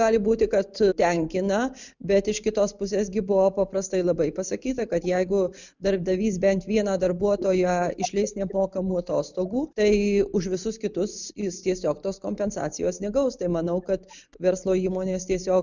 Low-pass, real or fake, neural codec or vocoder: 7.2 kHz; real; none